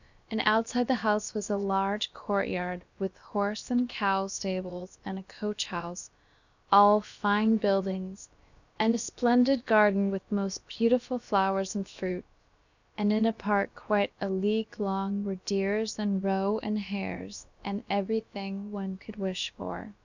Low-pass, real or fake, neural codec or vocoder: 7.2 kHz; fake; codec, 16 kHz, about 1 kbps, DyCAST, with the encoder's durations